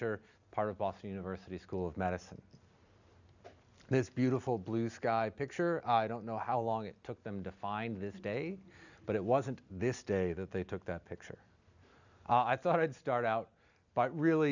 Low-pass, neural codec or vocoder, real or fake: 7.2 kHz; none; real